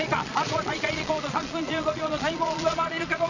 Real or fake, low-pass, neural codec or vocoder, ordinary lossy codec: fake; 7.2 kHz; vocoder, 22.05 kHz, 80 mel bands, Vocos; none